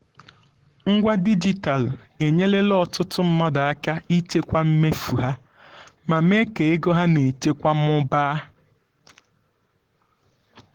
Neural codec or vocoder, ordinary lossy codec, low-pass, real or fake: codec, 44.1 kHz, 7.8 kbps, Pupu-Codec; Opus, 16 kbps; 19.8 kHz; fake